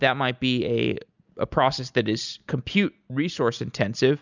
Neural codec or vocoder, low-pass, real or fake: none; 7.2 kHz; real